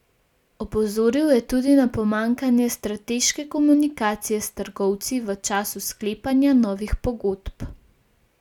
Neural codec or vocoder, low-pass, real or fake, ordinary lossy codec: none; 19.8 kHz; real; none